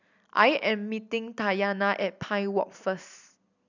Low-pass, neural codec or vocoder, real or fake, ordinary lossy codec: 7.2 kHz; none; real; none